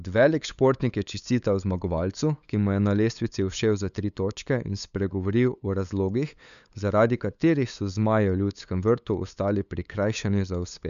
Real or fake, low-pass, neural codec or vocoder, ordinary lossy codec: fake; 7.2 kHz; codec, 16 kHz, 8 kbps, FunCodec, trained on LibriTTS, 25 frames a second; none